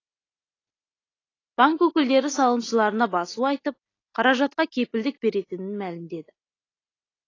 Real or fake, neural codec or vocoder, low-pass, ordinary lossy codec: real; none; 7.2 kHz; AAC, 32 kbps